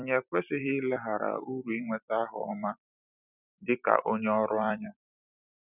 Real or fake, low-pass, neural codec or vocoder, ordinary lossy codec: fake; 3.6 kHz; vocoder, 44.1 kHz, 128 mel bands every 256 samples, BigVGAN v2; none